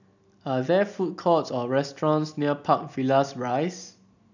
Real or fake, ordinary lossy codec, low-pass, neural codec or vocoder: real; none; 7.2 kHz; none